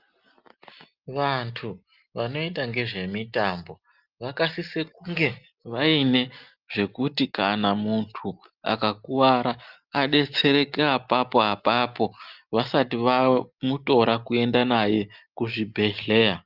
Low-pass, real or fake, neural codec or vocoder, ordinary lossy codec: 5.4 kHz; real; none; Opus, 24 kbps